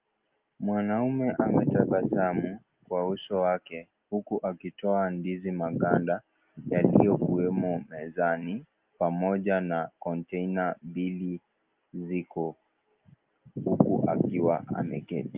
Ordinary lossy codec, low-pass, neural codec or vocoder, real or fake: Opus, 24 kbps; 3.6 kHz; none; real